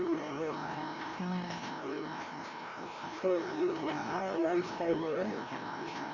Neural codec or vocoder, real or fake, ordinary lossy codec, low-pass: codec, 16 kHz, 1 kbps, FreqCodec, larger model; fake; none; 7.2 kHz